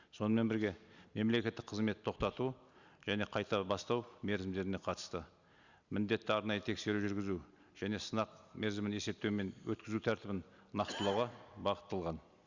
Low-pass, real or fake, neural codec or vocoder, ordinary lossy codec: 7.2 kHz; real; none; Opus, 64 kbps